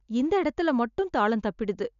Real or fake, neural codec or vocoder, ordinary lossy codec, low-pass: real; none; none; 7.2 kHz